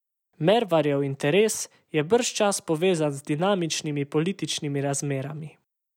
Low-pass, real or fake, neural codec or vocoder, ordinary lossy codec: 19.8 kHz; real; none; none